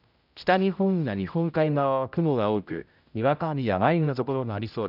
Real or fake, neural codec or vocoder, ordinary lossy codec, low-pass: fake; codec, 16 kHz, 0.5 kbps, X-Codec, HuBERT features, trained on general audio; none; 5.4 kHz